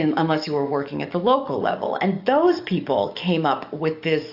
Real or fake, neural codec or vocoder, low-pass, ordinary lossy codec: fake; codec, 44.1 kHz, 7.8 kbps, DAC; 5.4 kHz; AAC, 48 kbps